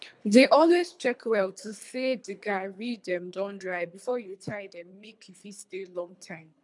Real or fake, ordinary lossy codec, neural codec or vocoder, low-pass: fake; MP3, 96 kbps; codec, 24 kHz, 3 kbps, HILCodec; 10.8 kHz